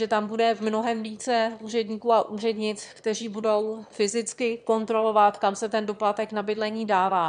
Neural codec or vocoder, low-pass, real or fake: autoencoder, 22.05 kHz, a latent of 192 numbers a frame, VITS, trained on one speaker; 9.9 kHz; fake